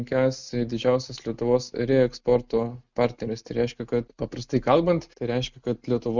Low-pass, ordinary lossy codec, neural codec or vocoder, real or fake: 7.2 kHz; Opus, 64 kbps; none; real